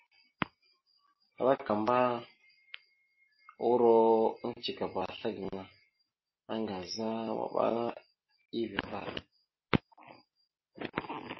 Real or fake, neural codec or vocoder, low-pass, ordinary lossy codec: real; none; 7.2 kHz; MP3, 24 kbps